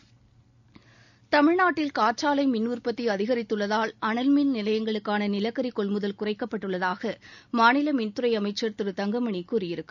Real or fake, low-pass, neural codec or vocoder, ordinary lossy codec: real; 7.2 kHz; none; none